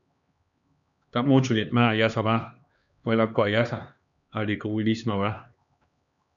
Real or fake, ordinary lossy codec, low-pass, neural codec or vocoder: fake; MP3, 96 kbps; 7.2 kHz; codec, 16 kHz, 2 kbps, X-Codec, HuBERT features, trained on LibriSpeech